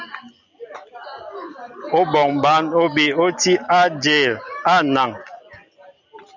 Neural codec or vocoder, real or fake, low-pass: none; real; 7.2 kHz